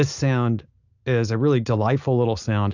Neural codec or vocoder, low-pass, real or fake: none; 7.2 kHz; real